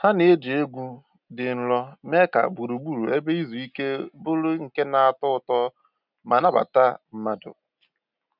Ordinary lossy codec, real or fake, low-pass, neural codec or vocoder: none; real; 5.4 kHz; none